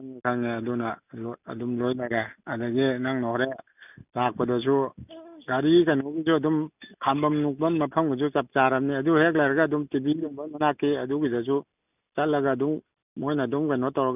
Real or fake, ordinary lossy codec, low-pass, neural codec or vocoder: real; none; 3.6 kHz; none